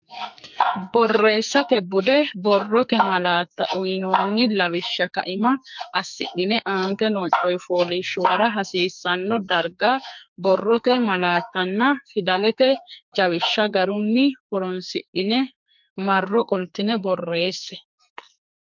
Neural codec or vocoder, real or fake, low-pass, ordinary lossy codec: codec, 44.1 kHz, 2.6 kbps, SNAC; fake; 7.2 kHz; MP3, 64 kbps